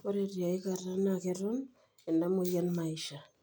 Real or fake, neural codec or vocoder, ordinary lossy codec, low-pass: real; none; none; none